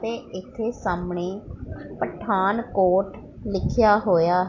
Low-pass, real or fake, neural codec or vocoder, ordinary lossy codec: 7.2 kHz; real; none; AAC, 48 kbps